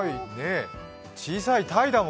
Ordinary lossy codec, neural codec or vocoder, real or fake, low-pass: none; none; real; none